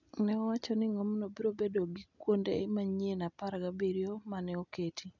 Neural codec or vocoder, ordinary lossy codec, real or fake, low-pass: none; none; real; 7.2 kHz